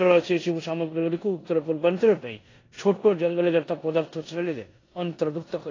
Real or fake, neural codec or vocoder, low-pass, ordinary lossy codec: fake; codec, 16 kHz in and 24 kHz out, 0.9 kbps, LongCat-Audio-Codec, four codebook decoder; 7.2 kHz; AAC, 32 kbps